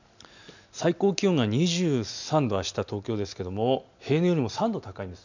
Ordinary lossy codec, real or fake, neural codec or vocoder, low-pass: none; real; none; 7.2 kHz